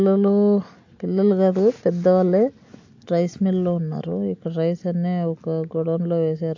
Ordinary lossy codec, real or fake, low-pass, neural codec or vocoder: AAC, 48 kbps; real; 7.2 kHz; none